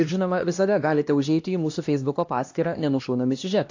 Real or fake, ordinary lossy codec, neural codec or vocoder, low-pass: fake; AAC, 48 kbps; codec, 16 kHz, 1 kbps, X-Codec, HuBERT features, trained on LibriSpeech; 7.2 kHz